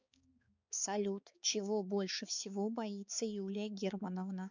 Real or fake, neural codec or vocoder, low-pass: fake; codec, 16 kHz, 4 kbps, X-Codec, HuBERT features, trained on balanced general audio; 7.2 kHz